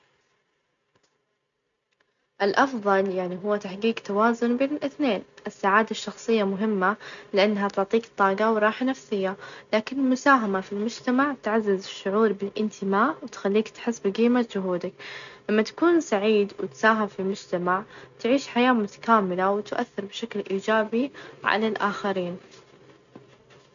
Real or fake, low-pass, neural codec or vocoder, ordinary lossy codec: real; 7.2 kHz; none; none